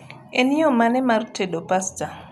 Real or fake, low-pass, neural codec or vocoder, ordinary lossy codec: real; 14.4 kHz; none; none